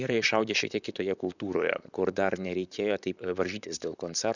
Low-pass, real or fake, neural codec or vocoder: 7.2 kHz; fake; vocoder, 44.1 kHz, 128 mel bands, Pupu-Vocoder